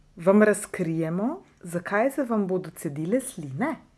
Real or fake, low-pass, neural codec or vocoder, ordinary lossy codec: real; none; none; none